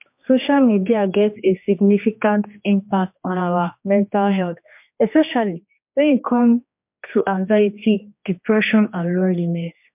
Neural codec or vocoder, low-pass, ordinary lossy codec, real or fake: codec, 16 kHz, 2 kbps, X-Codec, HuBERT features, trained on general audio; 3.6 kHz; MP3, 32 kbps; fake